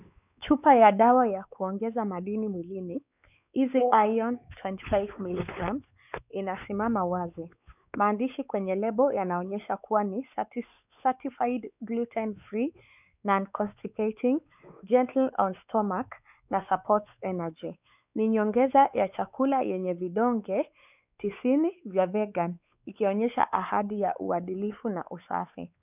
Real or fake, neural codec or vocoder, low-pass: fake; codec, 16 kHz, 4 kbps, X-Codec, WavLM features, trained on Multilingual LibriSpeech; 3.6 kHz